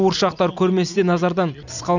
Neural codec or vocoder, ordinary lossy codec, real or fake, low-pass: none; none; real; 7.2 kHz